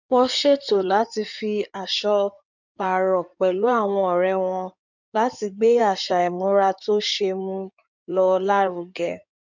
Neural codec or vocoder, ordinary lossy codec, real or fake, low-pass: codec, 16 kHz in and 24 kHz out, 2.2 kbps, FireRedTTS-2 codec; none; fake; 7.2 kHz